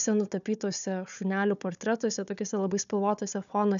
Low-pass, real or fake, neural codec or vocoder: 7.2 kHz; fake; codec, 16 kHz, 4 kbps, FunCodec, trained on Chinese and English, 50 frames a second